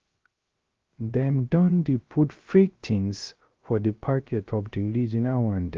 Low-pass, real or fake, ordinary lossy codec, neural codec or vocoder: 7.2 kHz; fake; Opus, 32 kbps; codec, 16 kHz, 0.3 kbps, FocalCodec